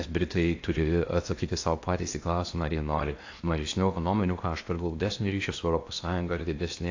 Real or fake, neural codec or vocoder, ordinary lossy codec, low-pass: fake; codec, 16 kHz in and 24 kHz out, 0.8 kbps, FocalCodec, streaming, 65536 codes; AAC, 48 kbps; 7.2 kHz